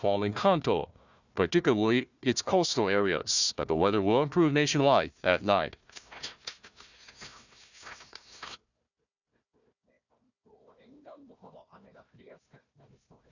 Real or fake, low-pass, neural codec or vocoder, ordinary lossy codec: fake; 7.2 kHz; codec, 16 kHz, 1 kbps, FunCodec, trained on Chinese and English, 50 frames a second; none